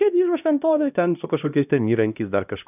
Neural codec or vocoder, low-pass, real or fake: codec, 16 kHz, 1 kbps, X-Codec, HuBERT features, trained on LibriSpeech; 3.6 kHz; fake